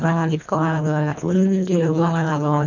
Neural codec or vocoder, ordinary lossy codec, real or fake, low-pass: codec, 24 kHz, 1.5 kbps, HILCodec; none; fake; 7.2 kHz